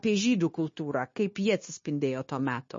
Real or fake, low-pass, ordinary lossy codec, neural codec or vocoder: fake; 7.2 kHz; MP3, 32 kbps; codec, 16 kHz, 0.9 kbps, LongCat-Audio-Codec